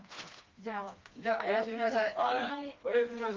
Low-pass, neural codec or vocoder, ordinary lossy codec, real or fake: 7.2 kHz; codec, 16 kHz, 2 kbps, FreqCodec, smaller model; Opus, 24 kbps; fake